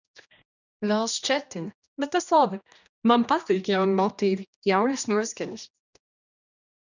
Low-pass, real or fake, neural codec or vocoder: 7.2 kHz; fake; codec, 16 kHz, 1 kbps, X-Codec, HuBERT features, trained on general audio